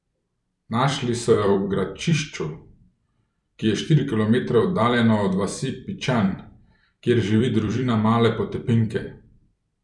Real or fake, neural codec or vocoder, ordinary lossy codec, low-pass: fake; vocoder, 44.1 kHz, 128 mel bands every 256 samples, BigVGAN v2; none; 10.8 kHz